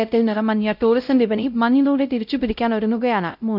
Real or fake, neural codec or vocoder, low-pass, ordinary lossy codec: fake; codec, 16 kHz, 0.5 kbps, X-Codec, WavLM features, trained on Multilingual LibriSpeech; 5.4 kHz; none